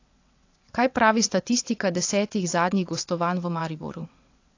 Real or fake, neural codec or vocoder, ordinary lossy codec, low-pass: fake; vocoder, 22.05 kHz, 80 mel bands, WaveNeXt; AAC, 48 kbps; 7.2 kHz